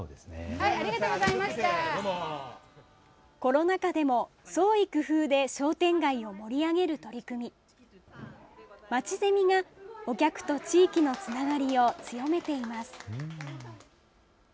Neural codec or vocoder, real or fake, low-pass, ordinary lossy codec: none; real; none; none